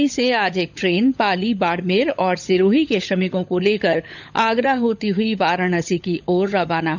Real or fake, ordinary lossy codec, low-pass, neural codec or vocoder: fake; none; 7.2 kHz; codec, 16 kHz, 16 kbps, FunCodec, trained on LibriTTS, 50 frames a second